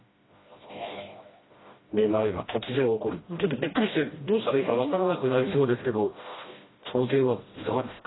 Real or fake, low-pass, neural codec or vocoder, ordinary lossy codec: fake; 7.2 kHz; codec, 16 kHz, 1 kbps, FreqCodec, smaller model; AAC, 16 kbps